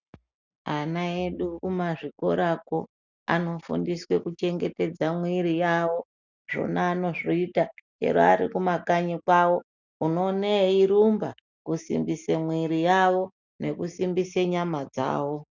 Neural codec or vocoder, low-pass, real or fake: none; 7.2 kHz; real